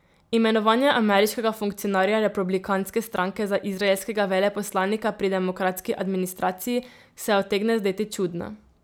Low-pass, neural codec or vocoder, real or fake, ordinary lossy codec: none; none; real; none